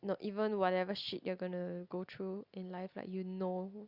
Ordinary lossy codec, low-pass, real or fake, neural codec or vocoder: Opus, 64 kbps; 5.4 kHz; real; none